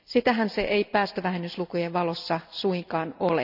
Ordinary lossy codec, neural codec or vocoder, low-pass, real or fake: none; none; 5.4 kHz; real